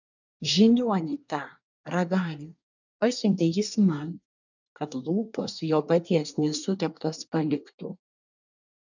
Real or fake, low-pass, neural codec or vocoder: fake; 7.2 kHz; codec, 24 kHz, 1 kbps, SNAC